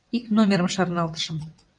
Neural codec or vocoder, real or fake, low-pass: vocoder, 22.05 kHz, 80 mel bands, WaveNeXt; fake; 9.9 kHz